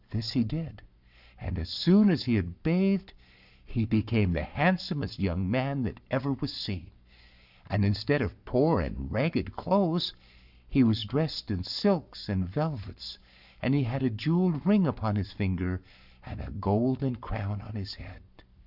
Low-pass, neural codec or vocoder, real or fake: 5.4 kHz; codec, 44.1 kHz, 7.8 kbps, Pupu-Codec; fake